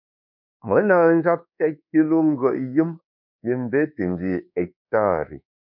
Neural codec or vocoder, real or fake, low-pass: codec, 24 kHz, 1.2 kbps, DualCodec; fake; 5.4 kHz